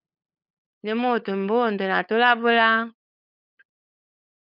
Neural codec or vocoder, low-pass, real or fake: codec, 16 kHz, 8 kbps, FunCodec, trained on LibriTTS, 25 frames a second; 5.4 kHz; fake